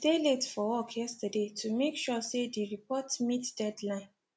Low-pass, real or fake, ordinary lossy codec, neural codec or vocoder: none; real; none; none